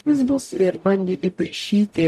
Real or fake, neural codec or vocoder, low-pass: fake; codec, 44.1 kHz, 0.9 kbps, DAC; 14.4 kHz